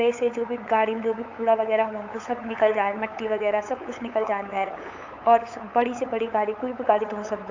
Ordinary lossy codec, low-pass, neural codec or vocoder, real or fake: AAC, 48 kbps; 7.2 kHz; codec, 16 kHz, 8 kbps, FunCodec, trained on LibriTTS, 25 frames a second; fake